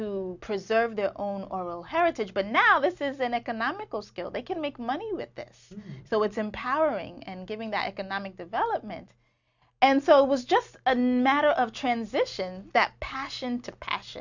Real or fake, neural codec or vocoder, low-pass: real; none; 7.2 kHz